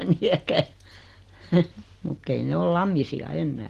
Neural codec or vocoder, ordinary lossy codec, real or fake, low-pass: none; Opus, 16 kbps; real; 14.4 kHz